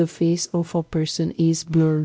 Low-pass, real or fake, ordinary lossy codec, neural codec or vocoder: none; fake; none; codec, 16 kHz, 0.5 kbps, X-Codec, WavLM features, trained on Multilingual LibriSpeech